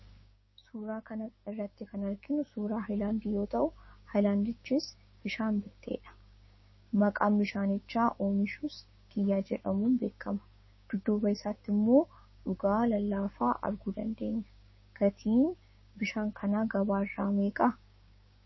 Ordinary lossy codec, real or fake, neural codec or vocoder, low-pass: MP3, 24 kbps; fake; codec, 16 kHz, 6 kbps, DAC; 7.2 kHz